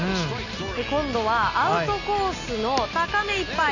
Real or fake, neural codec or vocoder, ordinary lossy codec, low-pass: real; none; none; 7.2 kHz